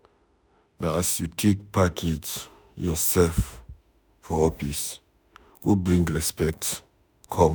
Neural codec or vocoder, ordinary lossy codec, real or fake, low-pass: autoencoder, 48 kHz, 32 numbers a frame, DAC-VAE, trained on Japanese speech; none; fake; none